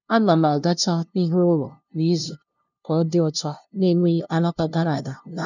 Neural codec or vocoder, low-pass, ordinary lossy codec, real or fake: codec, 16 kHz, 0.5 kbps, FunCodec, trained on LibriTTS, 25 frames a second; 7.2 kHz; none; fake